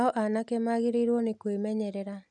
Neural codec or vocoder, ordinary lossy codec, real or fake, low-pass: none; none; real; 10.8 kHz